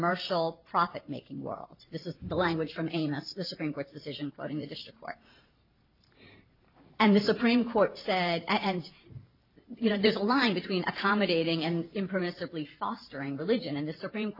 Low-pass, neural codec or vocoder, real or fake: 5.4 kHz; vocoder, 44.1 kHz, 128 mel bands every 512 samples, BigVGAN v2; fake